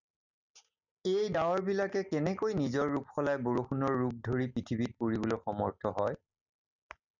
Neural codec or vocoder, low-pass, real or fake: none; 7.2 kHz; real